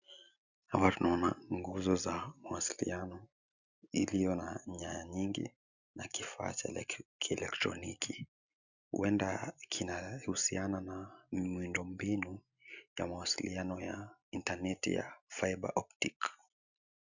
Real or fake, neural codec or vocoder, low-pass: real; none; 7.2 kHz